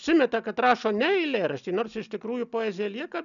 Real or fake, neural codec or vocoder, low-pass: real; none; 7.2 kHz